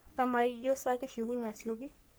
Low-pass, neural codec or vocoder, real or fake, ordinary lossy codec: none; codec, 44.1 kHz, 3.4 kbps, Pupu-Codec; fake; none